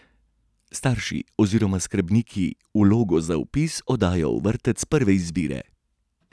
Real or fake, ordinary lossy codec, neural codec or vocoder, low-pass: real; none; none; none